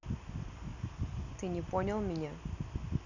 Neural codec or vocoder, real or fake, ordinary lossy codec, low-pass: none; real; none; 7.2 kHz